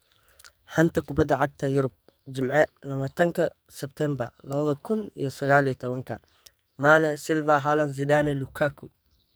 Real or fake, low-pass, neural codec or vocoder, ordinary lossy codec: fake; none; codec, 44.1 kHz, 2.6 kbps, SNAC; none